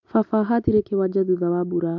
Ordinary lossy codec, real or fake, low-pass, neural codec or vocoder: none; real; 7.2 kHz; none